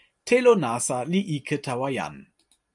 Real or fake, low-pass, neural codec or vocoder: real; 10.8 kHz; none